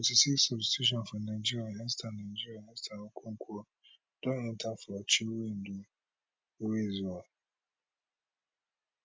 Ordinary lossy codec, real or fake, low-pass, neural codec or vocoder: none; real; none; none